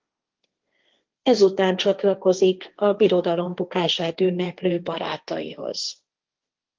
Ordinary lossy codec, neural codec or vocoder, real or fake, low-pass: Opus, 32 kbps; codec, 16 kHz, 1.1 kbps, Voila-Tokenizer; fake; 7.2 kHz